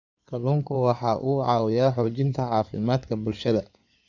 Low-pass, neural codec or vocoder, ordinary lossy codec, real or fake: 7.2 kHz; codec, 16 kHz in and 24 kHz out, 2.2 kbps, FireRedTTS-2 codec; none; fake